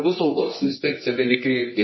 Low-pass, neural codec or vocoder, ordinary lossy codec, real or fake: 7.2 kHz; codec, 24 kHz, 0.9 kbps, WavTokenizer, medium music audio release; MP3, 24 kbps; fake